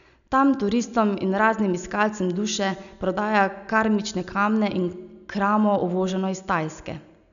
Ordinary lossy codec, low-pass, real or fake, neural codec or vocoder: none; 7.2 kHz; real; none